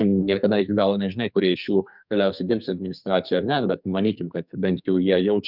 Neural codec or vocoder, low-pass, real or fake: codec, 44.1 kHz, 2.6 kbps, SNAC; 5.4 kHz; fake